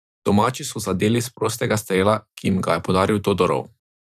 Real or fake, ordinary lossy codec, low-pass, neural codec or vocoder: fake; none; 14.4 kHz; vocoder, 44.1 kHz, 128 mel bands every 512 samples, BigVGAN v2